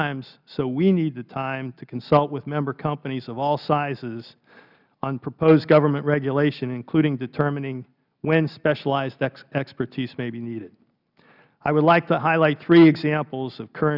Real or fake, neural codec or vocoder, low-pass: real; none; 5.4 kHz